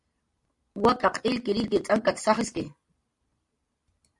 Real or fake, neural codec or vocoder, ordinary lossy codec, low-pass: real; none; MP3, 64 kbps; 10.8 kHz